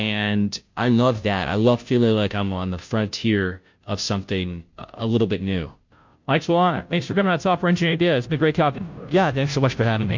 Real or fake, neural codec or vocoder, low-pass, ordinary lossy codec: fake; codec, 16 kHz, 0.5 kbps, FunCodec, trained on Chinese and English, 25 frames a second; 7.2 kHz; MP3, 48 kbps